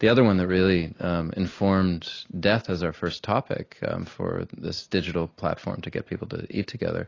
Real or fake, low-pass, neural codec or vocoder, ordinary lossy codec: real; 7.2 kHz; none; AAC, 32 kbps